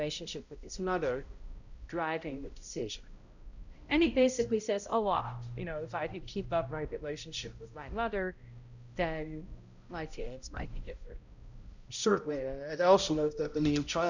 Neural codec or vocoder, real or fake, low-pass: codec, 16 kHz, 0.5 kbps, X-Codec, HuBERT features, trained on balanced general audio; fake; 7.2 kHz